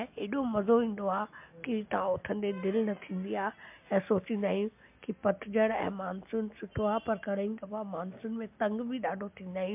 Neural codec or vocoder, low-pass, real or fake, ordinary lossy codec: none; 3.6 kHz; real; MP3, 32 kbps